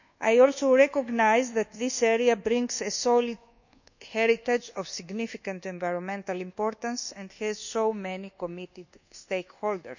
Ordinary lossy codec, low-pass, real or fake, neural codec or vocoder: none; 7.2 kHz; fake; codec, 24 kHz, 1.2 kbps, DualCodec